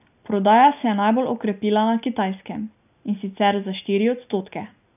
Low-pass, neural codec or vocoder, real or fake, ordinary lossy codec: 3.6 kHz; none; real; none